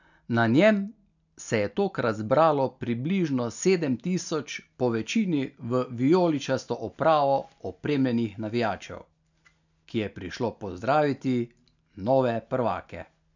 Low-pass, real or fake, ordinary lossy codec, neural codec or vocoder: 7.2 kHz; real; none; none